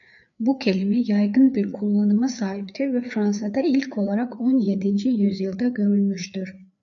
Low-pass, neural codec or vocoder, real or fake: 7.2 kHz; codec, 16 kHz, 4 kbps, FreqCodec, larger model; fake